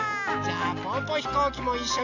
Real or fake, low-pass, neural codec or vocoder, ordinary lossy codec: real; 7.2 kHz; none; none